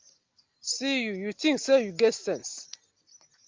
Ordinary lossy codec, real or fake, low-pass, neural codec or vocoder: Opus, 32 kbps; real; 7.2 kHz; none